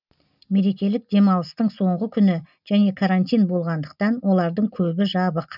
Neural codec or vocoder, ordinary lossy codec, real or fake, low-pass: none; none; real; 5.4 kHz